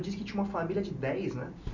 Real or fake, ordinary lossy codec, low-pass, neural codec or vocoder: real; none; 7.2 kHz; none